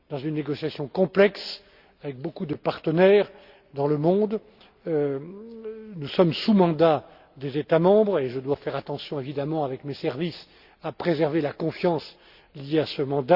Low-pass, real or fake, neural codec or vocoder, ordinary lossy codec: 5.4 kHz; real; none; Opus, 64 kbps